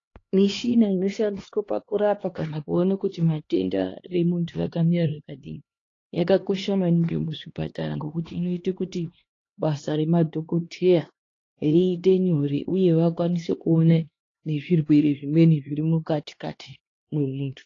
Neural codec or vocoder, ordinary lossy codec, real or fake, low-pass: codec, 16 kHz, 2 kbps, X-Codec, HuBERT features, trained on LibriSpeech; AAC, 32 kbps; fake; 7.2 kHz